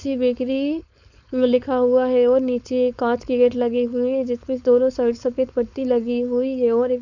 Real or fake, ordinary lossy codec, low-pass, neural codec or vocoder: fake; none; 7.2 kHz; codec, 16 kHz, 4.8 kbps, FACodec